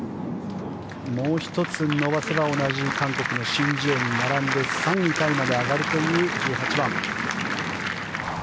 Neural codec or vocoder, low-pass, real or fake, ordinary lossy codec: none; none; real; none